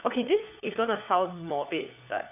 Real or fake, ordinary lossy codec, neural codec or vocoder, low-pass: fake; none; codec, 16 kHz, 4 kbps, FunCodec, trained on LibriTTS, 50 frames a second; 3.6 kHz